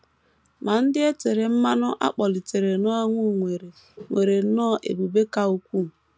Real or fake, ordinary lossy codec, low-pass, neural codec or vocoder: real; none; none; none